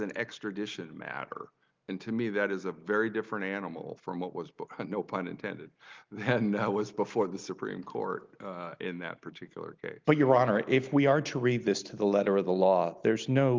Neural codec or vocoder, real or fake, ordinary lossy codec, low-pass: none; real; Opus, 32 kbps; 7.2 kHz